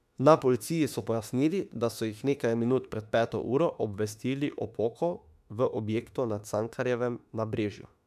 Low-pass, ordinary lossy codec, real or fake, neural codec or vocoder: 14.4 kHz; none; fake; autoencoder, 48 kHz, 32 numbers a frame, DAC-VAE, trained on Japanese speech